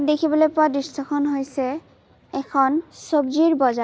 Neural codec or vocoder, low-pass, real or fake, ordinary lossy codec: none; none; real; none